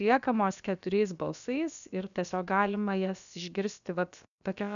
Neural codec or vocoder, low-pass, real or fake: codec, 16 kHz, about 1 kbps, DyCAST, with the encoder's durations; 7.2 kHz; fake